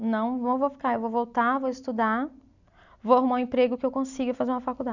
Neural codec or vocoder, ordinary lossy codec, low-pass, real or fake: none; none; 7.2 kHz; real